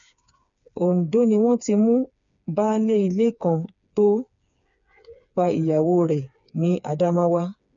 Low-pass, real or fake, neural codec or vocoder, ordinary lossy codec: 7.2 kHz; fake; codec, 16 kHz, 4 kbps, FreqCodec, smaller model; none